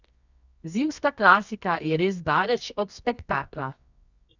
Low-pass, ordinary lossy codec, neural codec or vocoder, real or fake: 7.2 kHz; none; codec, 24 kHz, 0.9 kbps, WavTokenizer, medium music audio release; fake